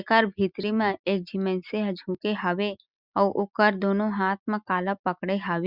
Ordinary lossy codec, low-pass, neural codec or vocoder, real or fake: Opus, 64 kbps; 5.4 kHz; none; real